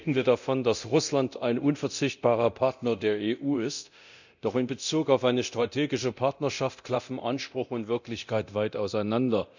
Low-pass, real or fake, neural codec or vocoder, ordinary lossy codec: 7.2 kHz; fake; codec, 24 kHz, 0.9 kbps, DualCodec; none